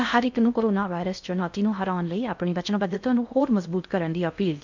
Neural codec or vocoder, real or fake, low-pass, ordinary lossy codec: codec, 16 kHz in and 24 kHz out, 0.6 kbps, FocalCodec, streaming, 4096 codes; fake; 7.2 kHz; none